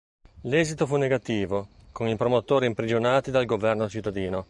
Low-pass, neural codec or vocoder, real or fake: 10.8 kHz; none; real